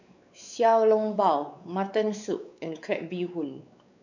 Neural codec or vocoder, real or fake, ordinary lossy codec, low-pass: codec, 16 kHz, 4 kbps, X-Codec, WavLM features, trained on Multilingual LibriSpeech; fake; none; 7.2 kHz